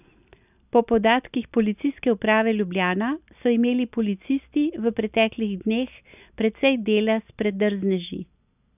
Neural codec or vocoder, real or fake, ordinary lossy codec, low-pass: none; real; none; 3.6 kHz